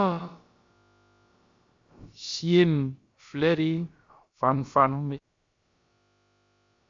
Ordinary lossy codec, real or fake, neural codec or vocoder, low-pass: MP3, 48 kbps; fake; codec, 16 kHz, about 1 kbps, DyCAST, with the encoder's durations; 7.2 kHz